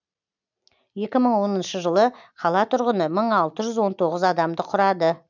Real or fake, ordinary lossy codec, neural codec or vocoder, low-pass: real; none; none; 7.2 kHz